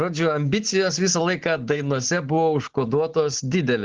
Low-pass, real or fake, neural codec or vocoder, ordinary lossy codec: 7.2 kHz; real; none; Opus, 16 kbps